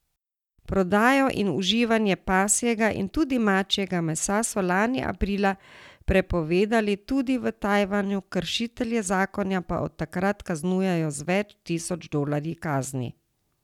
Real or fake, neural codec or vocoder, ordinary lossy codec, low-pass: real; none; none; 19.8 kHz